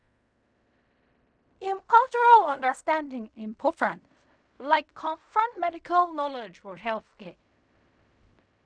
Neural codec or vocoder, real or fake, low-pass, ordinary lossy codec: codec, 16 kHz in and 24 kHz out, 0.4 kbps, LongCat-Audio-Codec, fine tuned four codebook decoder; fake; 9.9 kHz; none